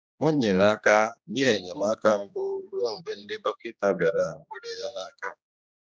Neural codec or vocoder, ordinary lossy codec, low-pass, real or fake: codec, 16 kHz, 1 kbps, X-Codec, HuBERT features, trained on general audio; none; none; fake